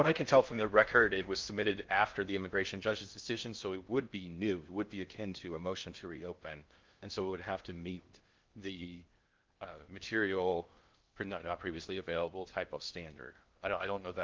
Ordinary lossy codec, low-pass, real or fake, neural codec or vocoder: Opus, 32 kbps; 7.2 kHz; fake; codec, 16 kHz in and 24 kHz out, 0.6 kbps, FocalCodec, streaming, 4096 codes